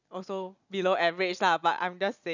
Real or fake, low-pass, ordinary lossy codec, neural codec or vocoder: real; 7.2 kHz; none; none